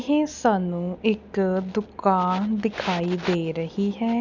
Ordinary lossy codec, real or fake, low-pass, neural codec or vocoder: none; real; 7.2 kHz; none